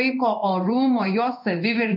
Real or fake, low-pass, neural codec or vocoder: fake; 5.4 kHz; codec, 16 kHz in and 24 kHz out, 1 kbps, XY-Tokenizer